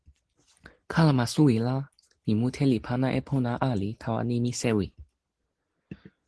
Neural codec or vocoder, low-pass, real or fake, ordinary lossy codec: none; 10.8 kHz; real; Opus, 16 kbps